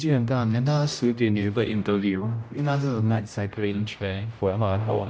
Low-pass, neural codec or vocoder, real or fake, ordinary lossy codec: none; codec, 16 kHz, 0.5 kbps, X-Codec, HuBERT features, trained on general audio; fake; none